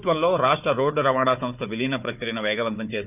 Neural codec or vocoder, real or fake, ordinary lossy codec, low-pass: codec, 16 kHz, 4 kbps, FunCodec, trained on Chinese and English, 50 frames a second; fake; none; 3.6 kHz